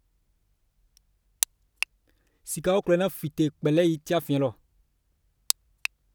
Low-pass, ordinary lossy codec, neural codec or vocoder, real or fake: none; none; none; real